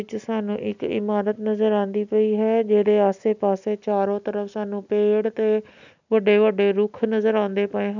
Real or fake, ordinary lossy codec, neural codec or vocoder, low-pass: real; none; none; 7.2 kHz